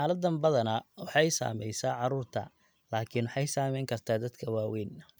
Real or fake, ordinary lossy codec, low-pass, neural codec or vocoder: real; none; none; none